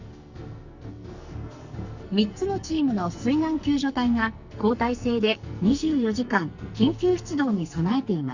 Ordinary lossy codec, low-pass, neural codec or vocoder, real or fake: Opus, 64 kbps; 7.2 kHz; codec, 44.1 kHz, 2.6 kbps, SNAC; fake